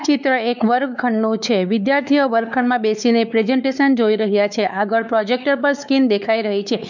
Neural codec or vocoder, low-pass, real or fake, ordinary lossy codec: codec, 16 kHz, 4 kbps, X-Codec, WavLM features, trained on Multilingual LibriSpeech; 7.2 kHz; fake; none